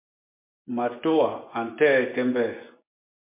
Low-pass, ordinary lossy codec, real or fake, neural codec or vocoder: 3.6 kHz; MP3, 24 kbps; fake; vocoder, 24 kHz, 100 mel bands, Vocos